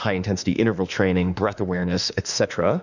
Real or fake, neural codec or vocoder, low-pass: fake; autoencoder, 48 kHz, 32 numbers a frame, DAC-VAE, trained on Japanese speech; 7.2 kHz